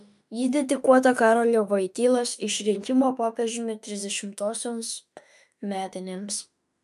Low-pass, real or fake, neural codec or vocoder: 14.4 kHz; fake; autoencoder, 48 kHz, 32 numbers a frame, DAC-VAE, trained on Japanese speech